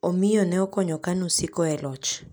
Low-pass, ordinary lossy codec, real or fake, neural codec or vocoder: none; none; real; none